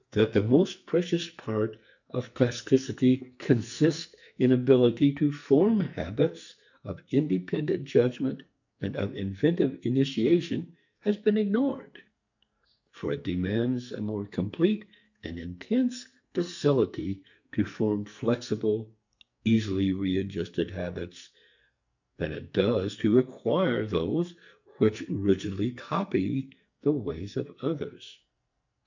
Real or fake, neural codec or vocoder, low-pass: fake; codec, 44.1 kHz, 2.6 kbps, SNAC; 7.2 kHz